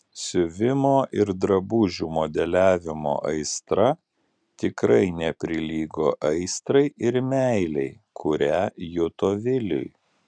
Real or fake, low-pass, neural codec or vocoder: real; 9.9 kHz; none